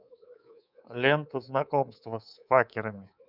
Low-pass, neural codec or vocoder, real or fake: 5.4 kHz; codec, 24 kHz, 3.1 kbps, DualCodec; fake